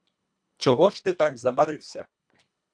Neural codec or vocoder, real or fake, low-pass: codec, 24 kHz, 1.5 kbps, HILCodec; fake; 9.9 kHz